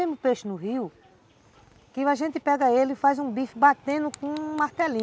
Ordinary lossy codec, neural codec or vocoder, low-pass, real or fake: none; none; none; real